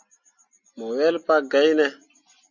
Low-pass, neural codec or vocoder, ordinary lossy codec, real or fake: 7.2 kHz; none; Opus, 64 kbps; real